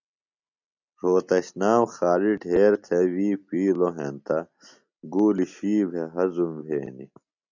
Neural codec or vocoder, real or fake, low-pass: none; real; 7.2 kHz